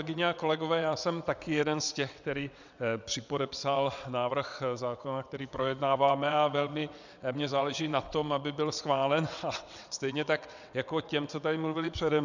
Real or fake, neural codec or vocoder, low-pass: fake; vocoder, 22.05 kHz, 80 mel bands, WaveNeXt; 7.2 kHz